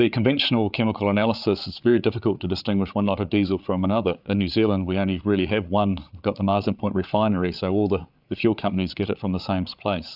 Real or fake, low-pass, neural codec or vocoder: fake; 5.4 kHz; codec, 16 kHz, 8 kbps, FreqCodec, larger model